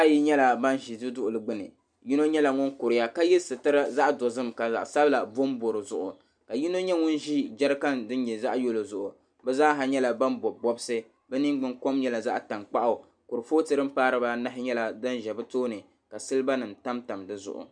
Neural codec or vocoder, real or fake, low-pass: none; real; 9.9 kHz